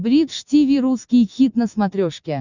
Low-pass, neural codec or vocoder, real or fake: 7.2 kHz; none; real